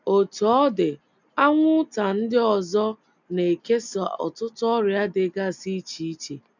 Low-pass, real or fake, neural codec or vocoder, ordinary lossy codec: 7.2 kHz; real; none; none